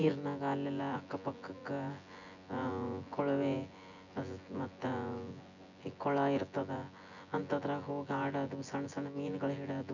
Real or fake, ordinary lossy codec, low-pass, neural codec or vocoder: fake; none; 7.2 kHz; vocoder, 24 kHz, 100 mel bands, Vocos